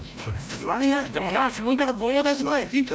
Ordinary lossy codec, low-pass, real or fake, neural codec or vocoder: none; none; fake; codec, 16 kHz, 0.5 kbps, FreqCodec, larger model